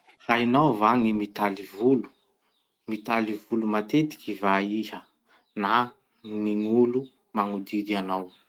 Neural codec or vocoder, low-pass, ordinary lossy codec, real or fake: autoencoder, 48 kHz, 128 numbers a frame, DAC-VAE, trained on Japanese speech; 19.8 kHz; Opus, 24 kbps; fake